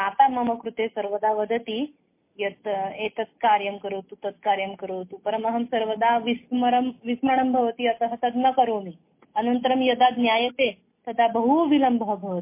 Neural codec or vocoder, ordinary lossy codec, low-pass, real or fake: none; MP3, 24 kbps; 3.6 kHz; real